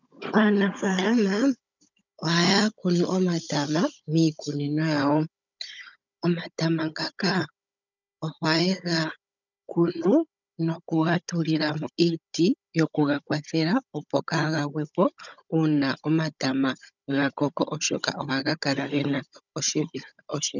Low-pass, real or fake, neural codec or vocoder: 7.2 kHz; fake; codec, 16 kHz, 16 kbps, FunCodec, trained on Chinese and English, 50 frames a second